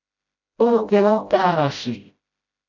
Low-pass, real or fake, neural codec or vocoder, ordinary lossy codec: 7.2 kHz; fake; codec, 16 kHz, 0.5 kbps, FreqCodec, smaller model; AAC, 48 kbps